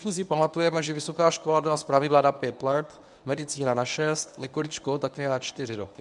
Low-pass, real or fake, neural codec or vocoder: 10.8 kHz; fake; codec, 24 kHz, 0.9 kbps, WavTokenizer, medium speech release version 1